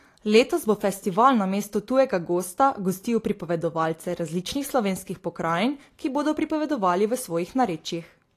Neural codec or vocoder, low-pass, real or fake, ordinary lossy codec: none; 14.4 kHz; real; AAC, 48 kbps